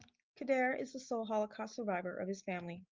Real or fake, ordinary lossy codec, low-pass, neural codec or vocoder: real; Opus, 32 kbps; 7.2 kHz; none